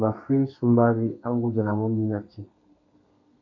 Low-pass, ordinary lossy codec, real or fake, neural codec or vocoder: 7.2 kHz; MP3, 64 kbps; fake; codec, 32 kHz, 1.9 kbps, SNAC